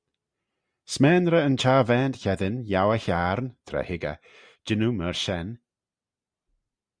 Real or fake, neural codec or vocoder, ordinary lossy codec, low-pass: real; none; Opus, 64 kbps; 9.9 kHz